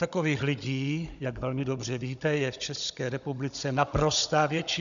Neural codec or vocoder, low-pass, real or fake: codec, 16 kHz, 16 kbps, FunCodec, trained on Chinese and English, 50 frames a second; 7.2 kHz; fake